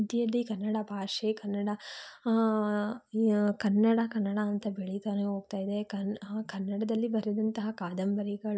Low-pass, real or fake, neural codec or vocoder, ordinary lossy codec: none; real; none; none